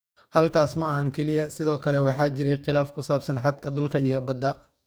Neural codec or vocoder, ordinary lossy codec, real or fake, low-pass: codec, 44.1 kHz, 2.6 kbps, DAC; none; fake; none